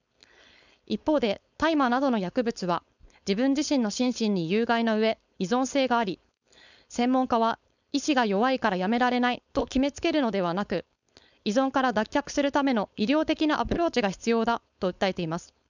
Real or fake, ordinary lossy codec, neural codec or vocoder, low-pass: fake; none; codec, 16 kHz, 4.8 kbps, FACodec; 7.2 kHz